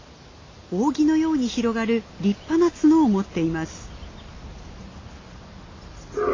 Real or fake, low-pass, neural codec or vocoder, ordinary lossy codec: real; 7.2 kHz; none; AAC, 32 kbps